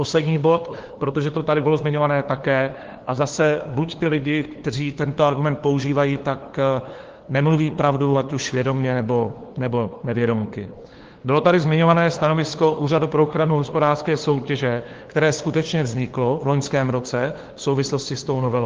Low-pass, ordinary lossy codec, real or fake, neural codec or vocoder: 7.2 kHz; Opus, 16 kbps; fake; codec, 16 kHz, 2 kbps, FunCodec, trained on LibriTTS, 25 frames a second